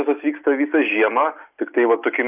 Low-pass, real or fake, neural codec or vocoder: 3.6 kHz; real; none